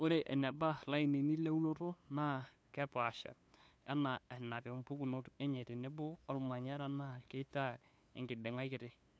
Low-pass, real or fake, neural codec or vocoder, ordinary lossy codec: none; fake; codec, 16 kHz, 2 kbps, FunCodec, trained on LibriTTS, 25 frames a second; none